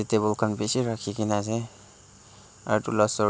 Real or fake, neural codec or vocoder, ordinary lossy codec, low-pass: real; none; none; none